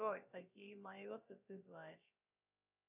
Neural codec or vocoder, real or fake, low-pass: codec, 16 kHz, 0.3 kbps, FocalCodec; fake; 3.6 kHz